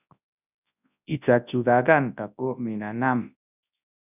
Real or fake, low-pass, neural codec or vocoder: fake; 3.6 kHz; codec, 24 kHz, 0.9 kbps, WavTokenizer, large speech release